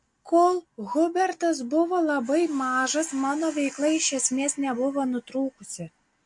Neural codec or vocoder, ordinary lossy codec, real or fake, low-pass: none; MP3, 48 kbps; real; 10.8 kHz